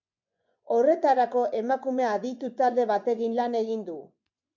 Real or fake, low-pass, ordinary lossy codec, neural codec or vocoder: fake; 7.2 kHz; MP3, 64 kbps; vocoder, 24 kHz, 100 mel bands, Vocos